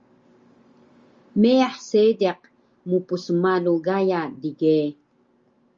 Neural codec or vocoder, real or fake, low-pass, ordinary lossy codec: none; real; 7.2 kHz; Opus, 32 kbps